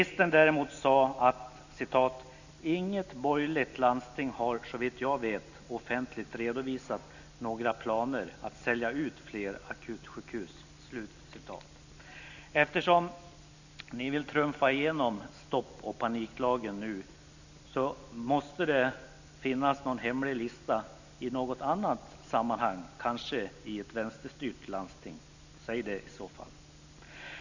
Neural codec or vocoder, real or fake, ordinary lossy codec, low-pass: none; real; none; 7.2 kHz